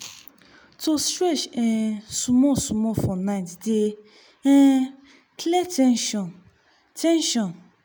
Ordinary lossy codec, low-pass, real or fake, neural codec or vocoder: none; none; real; none